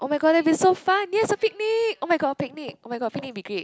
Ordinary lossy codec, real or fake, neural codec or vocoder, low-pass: none; real; none; none